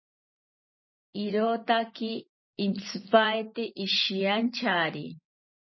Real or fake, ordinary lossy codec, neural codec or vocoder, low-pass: fake; MP3, 24 kbps; vocoder, 44.1 kHz, 128 mel bands every 512 samples, BigVGAN v2; 7.2 kHz